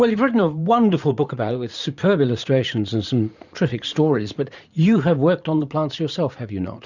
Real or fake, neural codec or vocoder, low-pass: fake; vocoder, 44.1 kHz, 80 mel bands, Vocos; 7.2 kHz